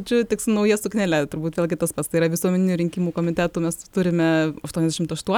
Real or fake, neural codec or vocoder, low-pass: real; none; 19.8 kHz